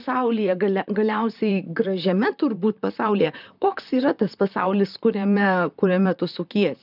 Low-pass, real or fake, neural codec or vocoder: 5.4 kHz; fake; vocoder, 44.1 kHz, 128 mel bands, Pupu-Vocoder